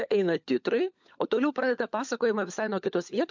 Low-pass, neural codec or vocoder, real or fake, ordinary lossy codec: 7.2 kHz; codec, 16 kHz, 16 kbps, FunCodec, trained on LibriTTS, 50 frames a second; fake; MP3, 64 kbps